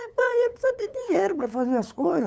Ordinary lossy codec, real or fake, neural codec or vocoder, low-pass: none; fake; codec, 16 kHz, 8 kbps, FunCodec, trained on LibriTTS, 25 frames a second; none